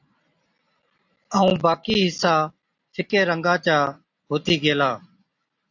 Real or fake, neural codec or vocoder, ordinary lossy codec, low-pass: real; none; AAC, 48 kbps; 7.2 kHz